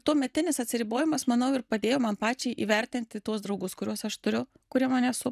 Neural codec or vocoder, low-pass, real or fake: none; 14.4 kHz; real